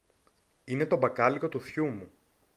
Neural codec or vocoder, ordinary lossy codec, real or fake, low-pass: none; Opus, 32 kbps; real; 14.4 kHz